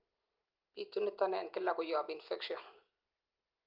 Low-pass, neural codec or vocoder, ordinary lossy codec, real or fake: 5.4 kHz; none; Opus, 32 kbps; real